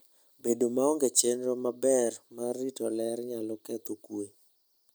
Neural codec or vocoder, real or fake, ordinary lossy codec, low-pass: none; real; none; none